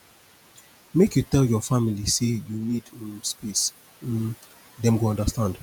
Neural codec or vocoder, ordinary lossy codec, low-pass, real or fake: vocoder, 48 kHz, 128 mel bands, Vocos; none; none; fake